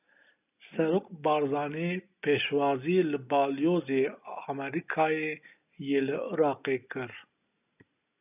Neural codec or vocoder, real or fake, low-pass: none; real; 3.6 kHz